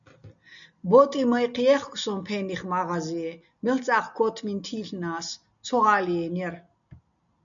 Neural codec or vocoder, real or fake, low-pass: none; real; 7.2 kHz